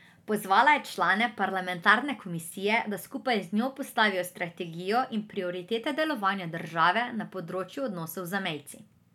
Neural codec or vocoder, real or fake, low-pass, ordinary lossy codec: none; real; 19.8 kHz; none